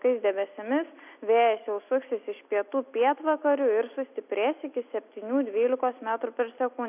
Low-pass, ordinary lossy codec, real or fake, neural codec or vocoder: 3.6 kHz; AAC, 32 kbps; real; none